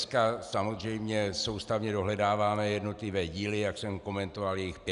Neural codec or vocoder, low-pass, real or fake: none; 10.8 kHz; real